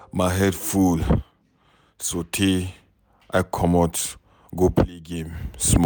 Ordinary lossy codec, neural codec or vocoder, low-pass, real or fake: none; none; none; real